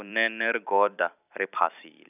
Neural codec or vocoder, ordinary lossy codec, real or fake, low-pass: vocoder, 44.1 kHz, 128 mel bands every 256 samples, BigVGAN v2; none; fake; 3.6 kHz